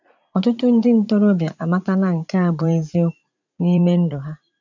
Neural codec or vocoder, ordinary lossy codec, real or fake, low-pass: vocoder, 44.1 kHz, 80 mel bands, Vocos; none; fake; 7.2 kHz